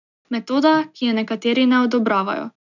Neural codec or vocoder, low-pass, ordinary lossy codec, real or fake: none; 7.2 kHz; none; real